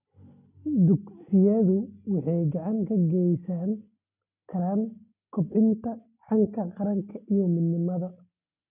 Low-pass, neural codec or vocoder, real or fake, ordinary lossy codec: 3.6 kHz; none; real; none